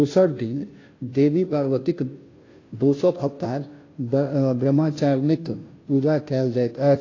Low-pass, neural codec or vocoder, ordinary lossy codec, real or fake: 7.2 kHz; codec, 16 kHz, 0.5 kbps, FunCodec, trained on Chinese and English, 25 frames a second; AAC, 32 kbps; fake